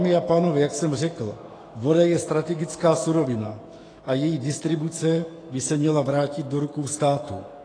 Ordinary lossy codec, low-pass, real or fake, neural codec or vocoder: AAC, 32 kbps; 9.9 kHz; fake; autoencoder, 48 kHz, 128 numbers a frame, DAC-VAE, trained on Japanese speech